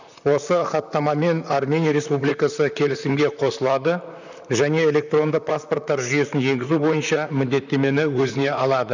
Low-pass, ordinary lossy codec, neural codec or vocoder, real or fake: 7.2 kHz; none; vocoder, 44.1 kHz, 128 mel bands, Pupu-Vocoder; fake